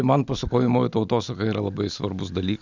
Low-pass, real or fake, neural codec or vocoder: 7.2 kHz; real; none